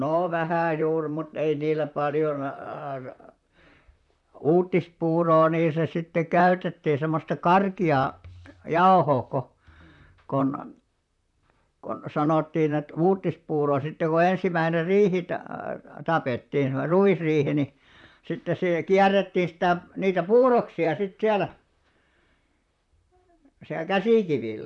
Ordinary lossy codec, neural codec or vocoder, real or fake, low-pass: none; vocoder, 44.1 kHz, 128 mel bands, Pupu-Vocoder; fake; 10.8 kHz